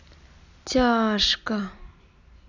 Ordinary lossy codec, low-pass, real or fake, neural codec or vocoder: none; 7.2 kHz; real; none